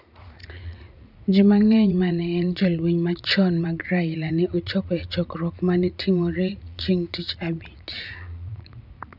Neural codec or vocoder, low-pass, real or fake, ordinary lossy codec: vocoder, 44.1 kHz, 80 mel bands, Vocos; 5.4 kHz; fake; none